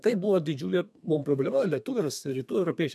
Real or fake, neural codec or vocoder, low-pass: fake; codec, 32 kHz, 1.9 kbps, SNAC; 14.4 kHz